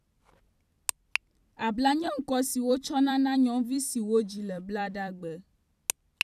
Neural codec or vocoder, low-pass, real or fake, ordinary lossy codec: vocoder, 44.1 kHz, 128 mel bands every 256 samples, BigVGAN v2; 14.4 kHz; fake; none